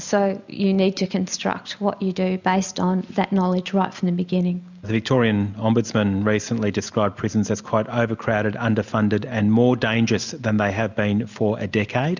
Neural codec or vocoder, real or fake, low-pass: none; real; 7.2 kHz